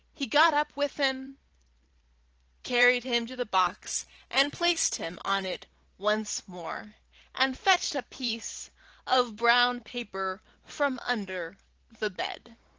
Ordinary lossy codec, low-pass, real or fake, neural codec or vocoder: Opus, 16 kbps; 7.2 kHz; fake; vocoder, 44.1 kHz, 128 mel bands every 512 samples, BigVGAN v2